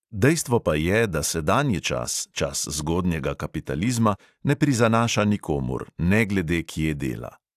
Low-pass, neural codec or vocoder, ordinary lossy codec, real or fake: 14.4 kHz; none; AAC, 96 kbps; real